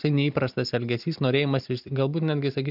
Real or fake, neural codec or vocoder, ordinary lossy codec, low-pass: real; none; AAC, 48 kbps; 5.4 kHz